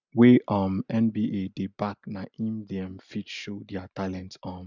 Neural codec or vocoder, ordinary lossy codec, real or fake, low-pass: none; none; real; 7.2 kHz